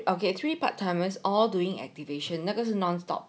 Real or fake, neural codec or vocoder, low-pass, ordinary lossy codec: real; none; none; none